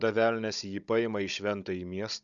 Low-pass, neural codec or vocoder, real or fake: 7.2 kHz; codec, 16 kHz, 16 kbps, FunCodec, trained on Chinese and English, 50 frames a second; fake